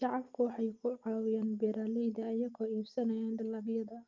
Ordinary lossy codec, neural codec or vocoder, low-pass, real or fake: Opus, 24 kbps; none; 7.2 kHz; real